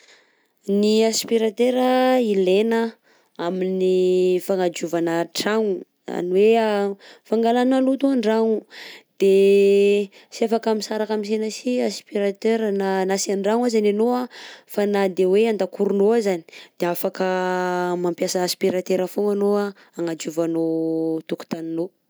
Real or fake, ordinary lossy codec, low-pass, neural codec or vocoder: real; none; none; none